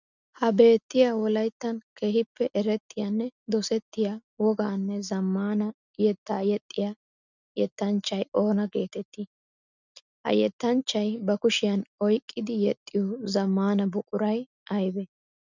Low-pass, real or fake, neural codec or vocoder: 7.2 kHz; real; none